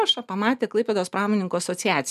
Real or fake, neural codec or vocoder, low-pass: real; none; 14.4 kHz